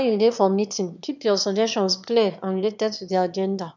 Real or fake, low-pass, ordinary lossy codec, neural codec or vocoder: fake; 7.2 kHz; none; autoencoder, 22.05 kHz, a latent of 192 numbers a frame, VITS, trained on one speaker